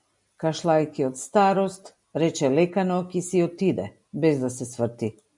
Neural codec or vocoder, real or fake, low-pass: none; real; 10.8 kHz